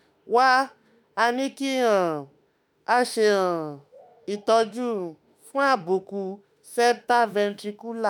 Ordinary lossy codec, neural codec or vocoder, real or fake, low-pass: none; autoencoder, 48 kHz, 32 numbers a frame, DAC-VAE, trained on Japanese speech; fake; none